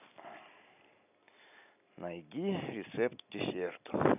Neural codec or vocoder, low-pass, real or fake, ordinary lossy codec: none; 3.6 kHz; real; none